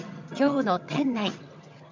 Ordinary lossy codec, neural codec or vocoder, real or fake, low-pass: MP3, 64 kbps; vocoder, 22.05 kHz, 80 mel bands, HiFi-GAN; fake; 7.2 kHz